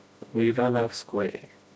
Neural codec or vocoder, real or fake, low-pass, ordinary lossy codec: codec, 16 kHz, 1 kbps, FreqCodec, smaller model; fake; none; none